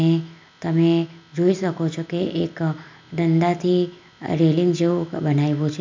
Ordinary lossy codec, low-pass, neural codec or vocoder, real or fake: MP3, 64 kbps; 7.2 kHz; none; real